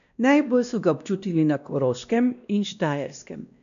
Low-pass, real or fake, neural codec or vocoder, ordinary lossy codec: 7.2 kHz; fake; codec, 16 kHz, 1 kbps, X-Codec, WavLM features, trained on Multilingual LibriSpeech; none